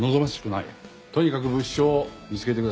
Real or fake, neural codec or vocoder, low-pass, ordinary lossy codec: real; none; none; none